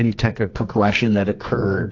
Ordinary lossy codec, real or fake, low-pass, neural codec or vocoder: AAC, 48 kbps; fake; 7.2 kHz; codec, 24 kHz, 0.9 kbps, WavTokenizer, medium music audio release